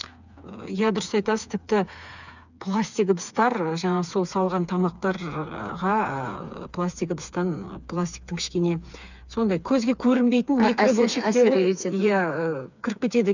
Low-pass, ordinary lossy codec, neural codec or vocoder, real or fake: 7.2 kHz; none; codec, 16 kHz, 4 kbps, FreqCodec, smaller model; fake